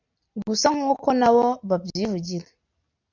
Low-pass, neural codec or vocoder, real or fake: 7.2 kHz; none; real